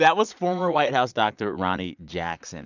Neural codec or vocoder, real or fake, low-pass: vocoder, 22.05 kHz, 80 mel bands, Vocos; fake; 7.2 kHz